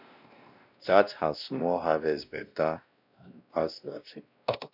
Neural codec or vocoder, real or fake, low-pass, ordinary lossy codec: codec, 16 kHz, 1 kbps, X-Codec, WavLM features, trained on Multilingual LibriSpeech; fake; 5.4 kHz; none